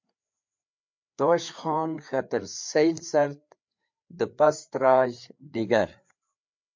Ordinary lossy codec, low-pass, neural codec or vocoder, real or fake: MP3, 48 kbps; 7.2 kHz; codec, 16 kHz, 4 kbps, FreqCodec, larger model; fake